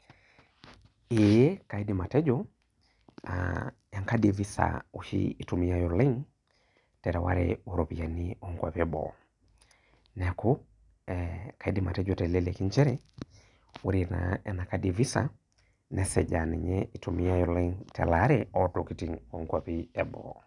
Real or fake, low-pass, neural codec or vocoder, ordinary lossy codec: real; 10.8 kHz; none; none